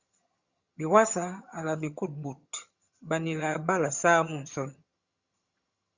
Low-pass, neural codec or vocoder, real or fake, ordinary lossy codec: 7.2 kHz; vocoder, 22.05 kHz, 80 mel bands, HiFi-GAN; fake; Opus, 64 kbps